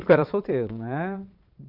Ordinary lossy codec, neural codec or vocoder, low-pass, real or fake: none; vocoder, 22.05 kHz, 80 mel bands, Vocos; 5.4 kHz; fake